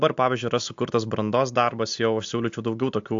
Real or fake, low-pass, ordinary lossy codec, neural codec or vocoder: real; 7.2 kHz; AAC, 64 kbps; none